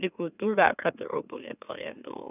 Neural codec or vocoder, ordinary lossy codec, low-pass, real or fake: autoencoder, 44.1 kHz, a latent of 192 numbers a frame, MeloTTS; none; 3.6 kHz; fake